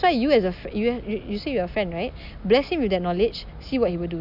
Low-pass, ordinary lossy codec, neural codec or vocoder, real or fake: 5.4 kHz; none; none; real